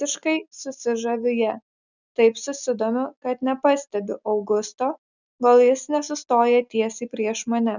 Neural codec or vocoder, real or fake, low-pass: none; real; 7.2 kHz